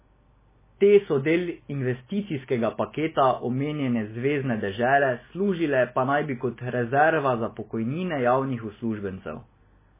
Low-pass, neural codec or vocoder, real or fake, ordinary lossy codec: 3.6 kHz; none; real; MP3, 16 kbps